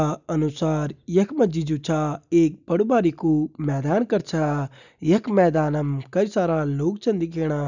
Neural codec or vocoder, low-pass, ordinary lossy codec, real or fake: none; 7.2 kHz; none; real